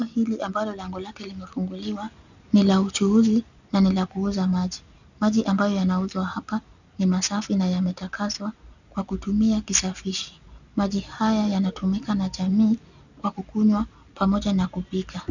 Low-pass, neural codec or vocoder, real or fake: 7.2 kHz; none; real